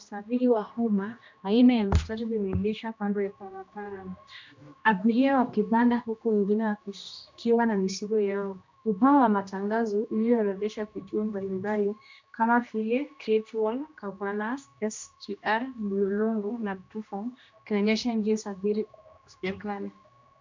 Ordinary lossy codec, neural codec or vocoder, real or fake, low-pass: MP3, 64 kbps; codec, 16 kHz, 1 kbps, X-Codec, HuBERT features, trained on general audio; fake; 7.2 kHz